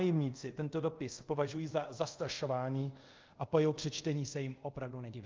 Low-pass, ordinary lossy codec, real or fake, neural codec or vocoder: 7.2 kHz; Opus, 24 kbps; fake; codec, 24 kHz, 0.5 kbps, DualCodec